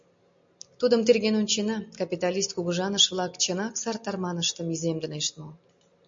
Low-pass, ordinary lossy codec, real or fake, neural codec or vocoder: 7.2 kHz; MP3, 48 kbps; real; none